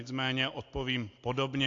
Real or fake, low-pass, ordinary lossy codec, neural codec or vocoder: real; 7.2 kHz; AAC, 48 kbps; none